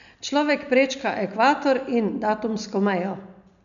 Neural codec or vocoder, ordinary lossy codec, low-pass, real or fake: none; none; 7.2 kHz; real